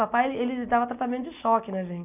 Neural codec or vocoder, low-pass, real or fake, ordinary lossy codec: none; 3.6 kHz; real; none